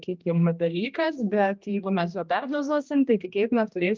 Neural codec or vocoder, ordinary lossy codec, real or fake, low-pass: codec, 16 kHz, 1 kbps, X-Codec, HuBERT features, trained on general audio; Opus, 24 kbps; fake; 7.2 kHz